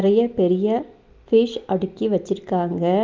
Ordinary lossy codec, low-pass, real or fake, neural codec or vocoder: Opus, 32 kbps; 7.2 kHz; real; none